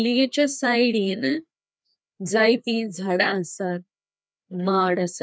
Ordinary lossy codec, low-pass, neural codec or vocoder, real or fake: none; none; codec, 16 kHz, 2 kbps, FreqCodec, larger model; fake